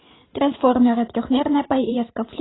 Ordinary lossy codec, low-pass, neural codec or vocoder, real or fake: AAC, 16 kbps; 7.2 kHz; vocoder, 44.1 kHz, 128 mel bands every 512 samples, BigVGAN v2; fake